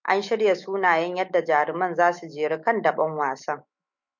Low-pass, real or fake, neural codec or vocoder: 7.2 kHz; fake; autoencoder, 48 kHz, 128 numbers a frame, DAC-VAE, trained on Japanese speech